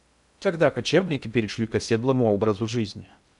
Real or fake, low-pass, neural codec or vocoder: fake; 10.8 kHz; codec, 16 kHz in and 24 kHz out, 0.6 kbps, FocalCodec, streaming, 2048 codes